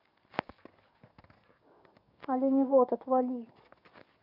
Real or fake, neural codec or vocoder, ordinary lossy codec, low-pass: fake; codec, 44.1 kHz, 7.8 kbps, DAC; none; 5.4 kHz